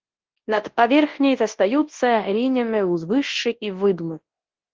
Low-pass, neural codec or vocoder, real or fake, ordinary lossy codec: 7.2 kHz; codec, 24 kHz, 0.9 kbps, WavTokenizer, large speech release; fake; Opus, 16 kbps